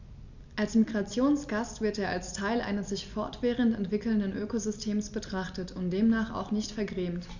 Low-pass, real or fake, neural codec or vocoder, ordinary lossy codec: 7.2 kHz; real; none; none